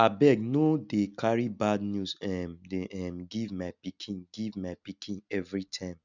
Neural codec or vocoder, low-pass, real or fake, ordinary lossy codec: none; 7.2 kHz; real; none